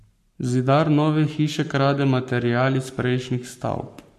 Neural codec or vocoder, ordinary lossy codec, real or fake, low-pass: codec, 44.1 kHz, 7.8 kbps, Pupu-Codec; MP3, 64 kbps; fake; 14.4 kHz